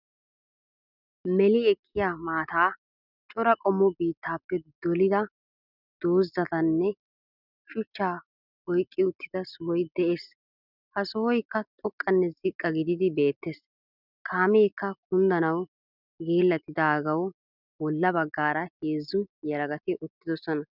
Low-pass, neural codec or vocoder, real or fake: 5.4 kHz; none; real